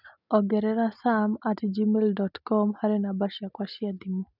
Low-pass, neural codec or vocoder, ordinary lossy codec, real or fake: 5.4 kHz; none; none; real